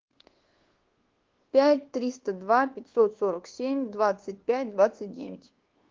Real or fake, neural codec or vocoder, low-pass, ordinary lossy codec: fake; codec, 24 kHz, 1.2 kbps, DualCodec; 7.2 kHz; Opus, 16 kbps